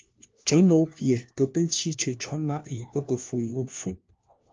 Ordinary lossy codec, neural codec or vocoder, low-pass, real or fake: Opus, 24 kbps; codec, 16 kHz, 1 kbps, FunCodec, trained on LibriTTS, 50 frames a second; 7.2 kHz; fake